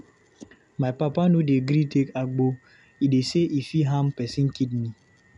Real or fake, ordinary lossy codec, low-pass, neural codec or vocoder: real; none; 10.8 kHz; none